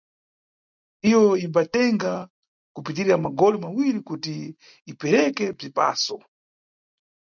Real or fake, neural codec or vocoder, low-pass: real; none; 7.2 kHz